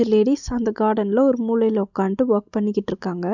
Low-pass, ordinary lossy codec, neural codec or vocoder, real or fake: 7.2 kHz; none; none; real